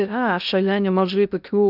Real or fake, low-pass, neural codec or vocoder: fake; 5.4 kHz; codec, 16 kHz in and 24 kHz out, 0.6 kbps, FocalCodec, streaming, 2048 codes